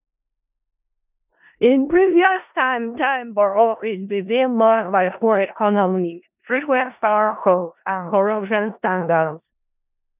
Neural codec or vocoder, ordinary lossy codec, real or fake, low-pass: codec, 16 kHz in and 24 kHz out, 0.4 kbps, LongCat-Audio-Codec, four codebook decoder; none; fake; 3.6 kHz